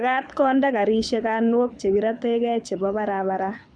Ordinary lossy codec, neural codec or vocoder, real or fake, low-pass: none; codec, 24 kHz, 6 kbps, HILCodec; fake; 9.9 kHz